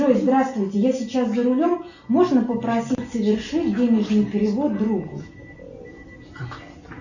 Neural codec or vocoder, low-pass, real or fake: none; 7.2 kHz; real